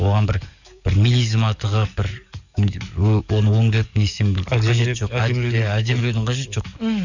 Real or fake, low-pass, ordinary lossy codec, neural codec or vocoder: fake; 7.2 kHz; none; codec, 44.1 kHz, 7.8 kbps, DAC